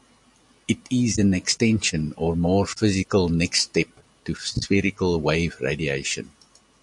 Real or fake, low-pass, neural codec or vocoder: real; 10.8 kHz; none